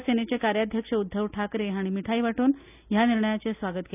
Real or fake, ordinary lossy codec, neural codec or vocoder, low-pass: real; none; none; 3.6 kHz